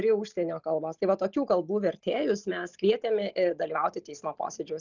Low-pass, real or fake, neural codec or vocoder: 7.2 kHz; real; none